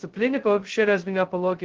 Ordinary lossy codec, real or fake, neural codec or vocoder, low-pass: Opus, 16 kbps; fake; codec, 16 kHz, 0.2 kbps, FocalCodec; 7.2 kHz